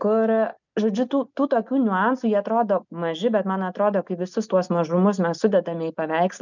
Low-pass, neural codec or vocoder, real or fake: 7.2 kHz; none; real